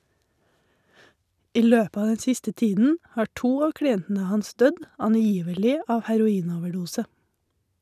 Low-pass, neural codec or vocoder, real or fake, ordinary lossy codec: 14.4 kHz; none; real; none